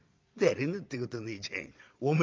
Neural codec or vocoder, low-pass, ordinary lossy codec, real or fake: vocoder, 22.05 kHz, 80 mel bands, Vocos; 7.2 kHz; Opus, 24 kbps; fake